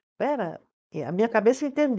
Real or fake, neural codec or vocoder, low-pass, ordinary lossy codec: fake; codec, 16 kHz, 4.8 kbps, FACodec; none; none